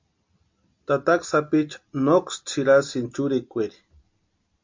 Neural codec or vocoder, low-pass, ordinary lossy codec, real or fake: none; 7.2 kHz; MP3, 48 kbps; real